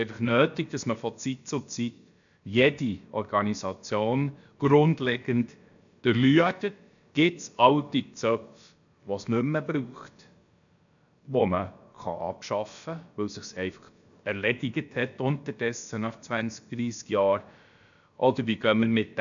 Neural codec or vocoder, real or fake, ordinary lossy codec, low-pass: codec, 16 kHz, about 1 kbps, DyCAST, with the encoder's durations; fake; none; 7.2 kHz